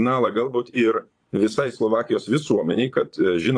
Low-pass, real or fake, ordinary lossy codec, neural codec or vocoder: 9.9 kHz; fake; AAC, 48 kbps; vocoder, 22.05 kHz, 80 mel bands, Vocos